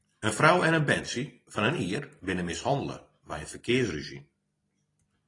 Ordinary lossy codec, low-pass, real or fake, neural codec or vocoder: AAC, 32 kbps; 10.8 kHz; fake; vocoder, 44.1 kHz, 128 mel bands every 256 samples, BigVGAN v2